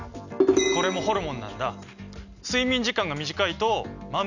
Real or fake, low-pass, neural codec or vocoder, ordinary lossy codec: real; 7.2 kHz; none; none